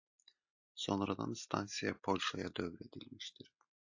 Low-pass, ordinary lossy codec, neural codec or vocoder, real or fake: 7.2 kHz; AAC, 48 kbps; none; real